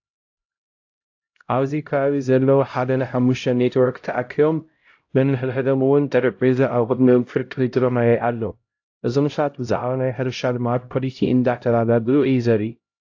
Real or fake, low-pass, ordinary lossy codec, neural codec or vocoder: fake; 7.2 kHz; AAC, 48 kbps; codec, 16 kHz, 0.5 kbps, X-Codec, HuBERT features, trained on LibriSpeech